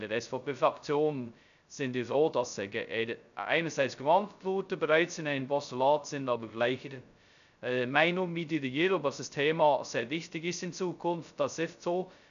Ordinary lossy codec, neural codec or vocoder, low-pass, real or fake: none; codec, 16 kHz, 0.2 kbps, FocalCodec; 7.2 kHz; fake